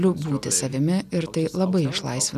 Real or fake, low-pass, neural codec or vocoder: real; 14.4 kHz; none